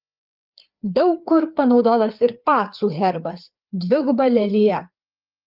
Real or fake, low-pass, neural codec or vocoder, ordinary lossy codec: fake; 5.4 kHz; codec, 16 kHz, 4 kbps, FreqCodec, larger model; Opus, 24 kbps